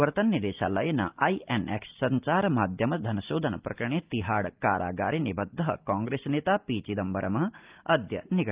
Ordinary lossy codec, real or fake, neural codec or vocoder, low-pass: Opus, 24 kbps; real; none; 3.6 kHz